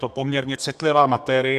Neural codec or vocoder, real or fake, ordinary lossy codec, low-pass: codec, 32 kHz, 1.9 kbps, SNAC; fake; AAC, 96 kbps; 14.4 kHz